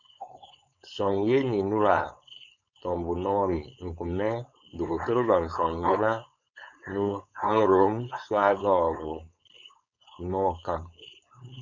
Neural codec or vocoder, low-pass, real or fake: codec, 16 kHz, 4.8 kbps, FACodec; 7.2 kHz; fake